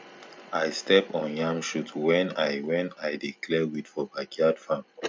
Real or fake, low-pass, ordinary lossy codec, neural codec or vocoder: real; none; none; none